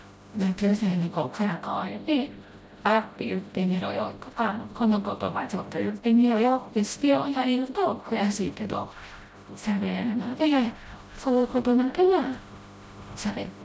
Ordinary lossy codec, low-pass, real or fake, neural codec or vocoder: none; none; fake; codec, 16 kHz, 0.5 kbps, FreqCodec, smaller model